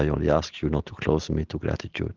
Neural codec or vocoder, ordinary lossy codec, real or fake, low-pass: none; Opus, 16 kbps; real; 7.2 kHz